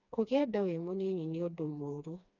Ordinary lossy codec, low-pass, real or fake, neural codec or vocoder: none; 7.2 kHz; fake; codec, 16 kHz, 2 kbps, FreqCodec, smaller model